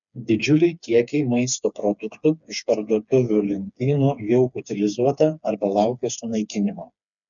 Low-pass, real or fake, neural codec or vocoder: 7.2 kHz; fake; codec, 16 kHz, 4 kbps, FreqCodec, smaller model